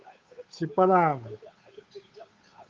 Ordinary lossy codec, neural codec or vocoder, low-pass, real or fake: Opus, 32 kbps; codec, 16 kHz, 8 kbps, FunCodec, trained on Chinese and English, 25 frames a second; 7.2 kHz; fake